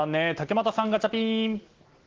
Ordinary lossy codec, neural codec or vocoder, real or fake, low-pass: Opus, 16 kbps; none; real; 7.2 kHz